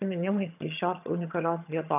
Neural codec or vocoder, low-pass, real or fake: vocoder, 22.05 kHz, 80 mel bands, HiFi-GAN; 3.6 kHz; fake